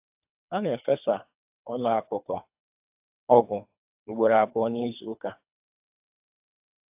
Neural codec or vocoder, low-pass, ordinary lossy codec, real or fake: codec, 24 kHz, 3 kbps, HILCodec; 3.6 kHz; AAC, 32 kbps; fake